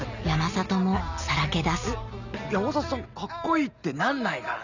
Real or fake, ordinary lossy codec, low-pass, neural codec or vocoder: real; none; 7.2 kHz; none